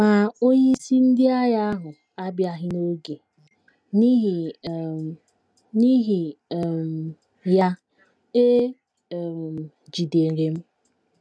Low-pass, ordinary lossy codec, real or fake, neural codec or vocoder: none; none; real; none